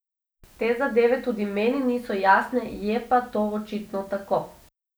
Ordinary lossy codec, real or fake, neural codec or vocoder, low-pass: none; real; none; none